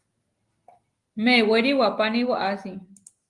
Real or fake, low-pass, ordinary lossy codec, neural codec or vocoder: real; 10.8 kHz; Opus, 24 kbps; none